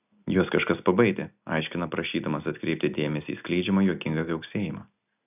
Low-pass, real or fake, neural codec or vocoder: 3.6 kHz; real; none